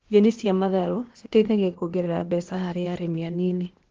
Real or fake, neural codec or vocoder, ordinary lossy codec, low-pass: fake; codec, 16 kHz, 0.8 kbps, ZipCodec; Opus, 16 kbps; 7.2 kHz